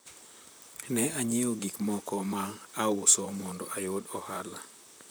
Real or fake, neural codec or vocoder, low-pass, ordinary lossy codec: fake; vocoder, 44.1 kHz, 128 mel bands, Pupu-Vocoder; none; none